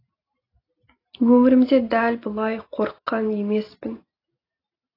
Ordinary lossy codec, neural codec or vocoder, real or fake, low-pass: AAC, 24 kbps; none; real; 5.4 kHz